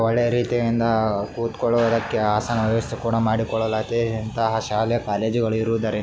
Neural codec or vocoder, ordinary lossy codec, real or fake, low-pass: none; none; real; none